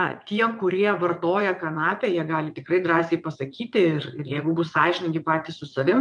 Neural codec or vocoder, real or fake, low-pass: vocoder, 22.05 kHz, 80 mel bands, WaveNeXt; fake; 9.9 kHz